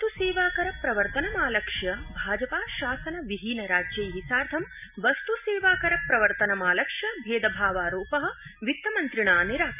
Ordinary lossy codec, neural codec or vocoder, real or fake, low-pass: none; none; real; 3.6 kHz